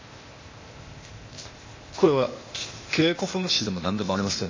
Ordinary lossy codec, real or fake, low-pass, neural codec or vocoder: MP3, 32 kbps; fake; 7.2 kHz; codec, 16 kHz, 0.8 kbps, ZipCodec